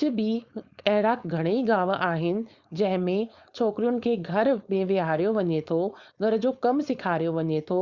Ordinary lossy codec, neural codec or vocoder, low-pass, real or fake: Opus, 64 kbps; codec, 16 kHz, 4.8 kbps, FACodec; 7.2 kHz; fake